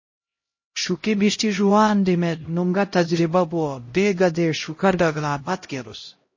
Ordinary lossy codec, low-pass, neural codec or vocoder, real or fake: MP3, 32 kbps; 7.2 kHz; codec, 16 kHz, 0.5 kbps, X-Codec, HuBERT features, trained on LibriSpeech; fake